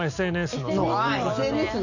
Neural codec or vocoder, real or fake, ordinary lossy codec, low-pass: none; real; none; 7.2 kHz